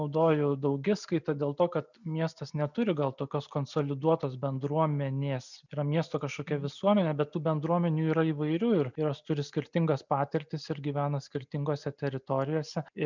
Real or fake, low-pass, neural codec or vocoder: real; 7.2 kHz; none